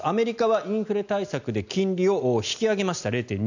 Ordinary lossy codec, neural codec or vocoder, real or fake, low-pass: none; none; real; 7.2 kHz